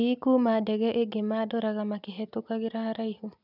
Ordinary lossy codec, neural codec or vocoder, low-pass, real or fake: none; none; 5.4 kHz; real